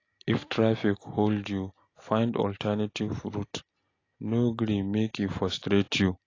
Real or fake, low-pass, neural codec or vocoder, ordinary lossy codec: real; 7.2 kHz; none; AAC, 32 kbps